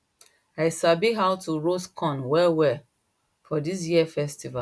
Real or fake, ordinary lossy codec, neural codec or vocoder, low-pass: real; none; none; none